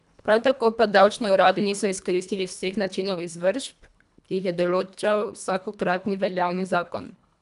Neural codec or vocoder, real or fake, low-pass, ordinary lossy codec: codec, 24 kHz, 1.5 kbps, HILCodec; fake; 10.8 kHz; none